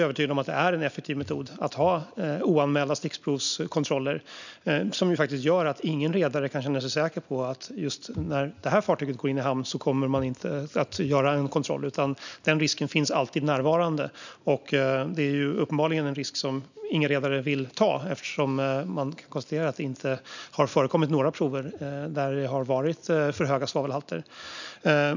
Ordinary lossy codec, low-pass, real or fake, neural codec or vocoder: none; 7.2 kHz; real; none